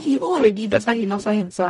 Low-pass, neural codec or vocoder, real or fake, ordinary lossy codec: 19.8 kHz; codec, 44.1 kHz, 0.9 kbps, DAC; fake; MP3, 48 kbps